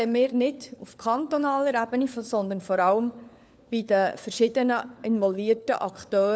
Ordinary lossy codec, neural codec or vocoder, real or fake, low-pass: none; codec, 16 kHz, 4 kbps, FunCodec, trained on LibriTTS, 50 frames a second; fake; none